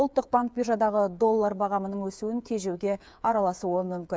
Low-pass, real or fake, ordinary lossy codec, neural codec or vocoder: none; fake; none; codec, 16 kHz, 8 kbps, FreqCodec, smaller model